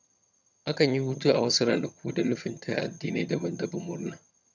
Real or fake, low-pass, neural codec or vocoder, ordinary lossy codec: fake; 7.2 kHz; vocoder, 22.05 kHz, 80 mel bands, HiFi-GAN; none